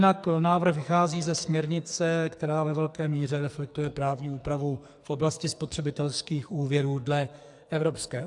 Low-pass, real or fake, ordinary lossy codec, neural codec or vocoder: 10.8 kHz; fake; AAC, 64 kbps; codec, 44.1 kHz, 2.6 kbps, SNAC